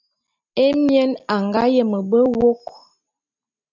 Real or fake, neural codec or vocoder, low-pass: real; none; 7.2 kHz